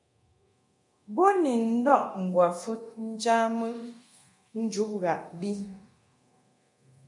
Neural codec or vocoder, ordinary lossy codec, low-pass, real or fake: codec, 24 kHz, 0.9 kbps, DualCodec; MP3, 48 kbps; 10.8 kHz; fake